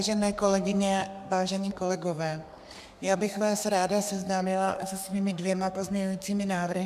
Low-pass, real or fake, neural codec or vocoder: 14.4 kHz; fake; codec, 32 kHz, 1.9 kbps, SNAC